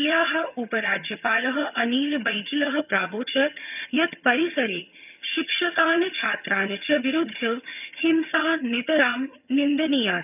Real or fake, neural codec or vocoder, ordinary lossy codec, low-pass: fake; vocoder, 22.05 kHz, 80 mel bands, HiFi-GAN; none; 3.6 kHz